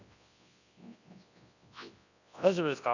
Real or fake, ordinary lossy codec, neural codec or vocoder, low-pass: fake; none; codec, 24 kHz, 0.9 kbps, WavTokenizer, large speech release; 7.2 kHz